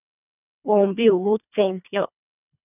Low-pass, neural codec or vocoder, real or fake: 3.6 kHz; codec, 24 kHz, 1.5 kbps, HILCodec; fake